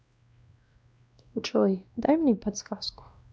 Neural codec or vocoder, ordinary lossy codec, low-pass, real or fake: codec, 16 kHz, 1 kbps, X-Codec, WavLM features, trained on Multilingual LibriSpeech; none; none; fake